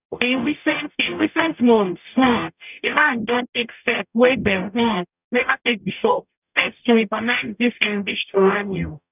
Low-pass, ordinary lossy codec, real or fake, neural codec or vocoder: 3.6 kHz; none; fake; codec, 44.1 kHz, 0.9 kbps, DAC